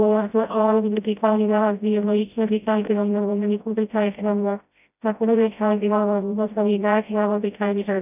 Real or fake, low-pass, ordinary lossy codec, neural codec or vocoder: fake; 3.6 kHz; none; codec, 16 kHz, 0.5 kbps, FreqCodec, smaller model